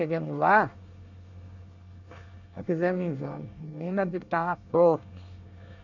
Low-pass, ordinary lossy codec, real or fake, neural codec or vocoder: 7.2 kHz; none; fake; codec, 24 kHz, 1 kbps, SNAC